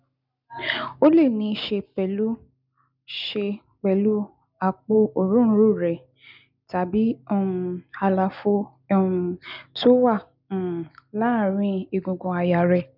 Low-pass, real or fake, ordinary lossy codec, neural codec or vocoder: 5.4 kHz; real; none; none